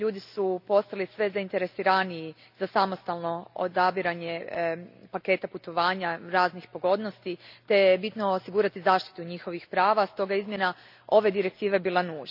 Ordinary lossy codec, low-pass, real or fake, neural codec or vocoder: none; 5.4 kHz; real; none